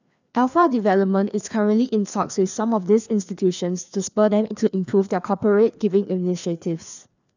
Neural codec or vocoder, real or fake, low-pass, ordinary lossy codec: codec, 16 kHz, 2 kbps, FreqCodec, larger model; fake; 7.2 kHz; none